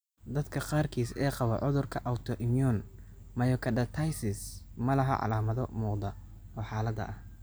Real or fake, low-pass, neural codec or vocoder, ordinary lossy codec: real; none; none; none